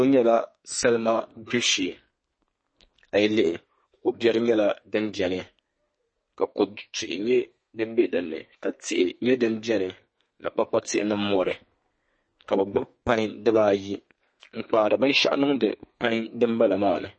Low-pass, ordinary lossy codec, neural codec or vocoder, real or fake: 9.9 kHz; MP3, 32 kbps; codec, 32 kHz, 1.9 kbps, SNAC; fake